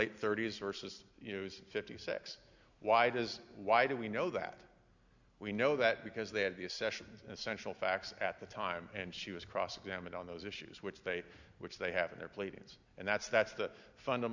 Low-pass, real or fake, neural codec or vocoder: 7.2 kHz; real; none